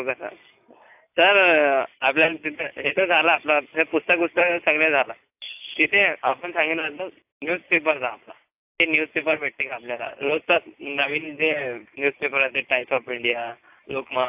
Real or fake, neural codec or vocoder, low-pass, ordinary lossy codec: real; none; 3.6 kHz; AAC, 32 kbps